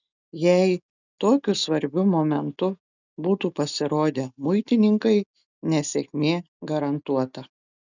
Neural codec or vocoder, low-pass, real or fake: none; 7.2 kHz; real